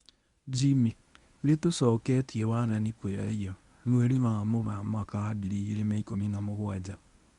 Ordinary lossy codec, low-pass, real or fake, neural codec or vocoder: Opus, 64 kbps; 10.8 kHz; fake; codec, 24 kHz, 0.9 kbps, WavTokenizer, medium speech release version 1